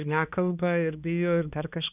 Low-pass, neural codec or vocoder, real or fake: 3.6 kHz; codec, 16 kHz, 2 kbps, X-Codec, HuBERT features, trained on balanced general audio; fake